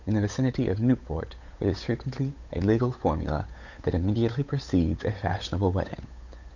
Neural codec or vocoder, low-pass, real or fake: codec, 16 kHz, 8 kbps, FunCodec, trained on Chinese and English, 25 frames a second; 7.2 kHz; fake